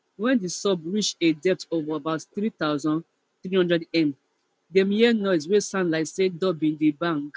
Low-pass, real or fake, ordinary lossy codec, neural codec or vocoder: none; real; none; none